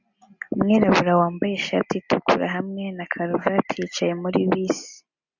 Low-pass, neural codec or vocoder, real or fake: 7.2 kHz; none; real